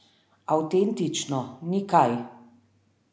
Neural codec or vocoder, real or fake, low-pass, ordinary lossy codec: none; real; none; none